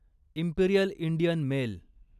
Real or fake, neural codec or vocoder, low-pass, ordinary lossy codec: real; none; 14.4 kHz; none